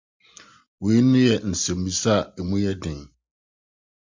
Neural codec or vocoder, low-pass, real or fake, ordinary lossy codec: none; 7.2 kHz; real; MP3, 64 kbps